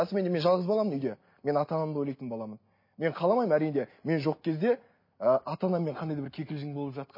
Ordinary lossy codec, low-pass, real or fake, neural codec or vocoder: MP3, 24 kbps; 5.4 kHz; real; none